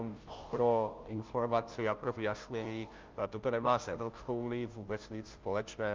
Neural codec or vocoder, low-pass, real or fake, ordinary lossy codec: codec, 16 kHz, 0.5 kbps, FunCodec, trained on Chinese and English, 25 frames a second; 7.2 kHz; fake; Opus, 24 kbps